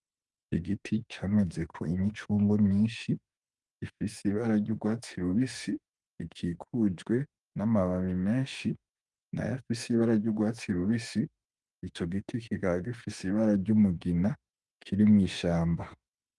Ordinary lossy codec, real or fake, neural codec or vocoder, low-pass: Opus, 32 kbps; fake; autoencoder, 48 kHz, 32 numbers a frame, DAC-VAE, trained on Japanese speech; 10.8 kHz